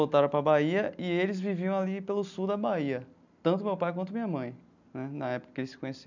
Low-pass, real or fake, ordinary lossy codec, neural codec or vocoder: 7.2 kHz; real; none; none